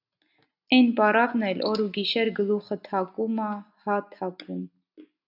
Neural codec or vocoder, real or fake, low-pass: none; real; 5.4 kHz